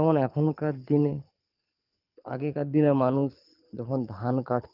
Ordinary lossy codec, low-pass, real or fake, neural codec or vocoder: Opus, 24 kbps; 5.4 kHz; fake; codec, 24 kHz, 6 kbps, HILCodec